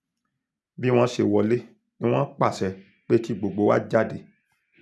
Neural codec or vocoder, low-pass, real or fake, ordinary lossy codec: none; none; real; none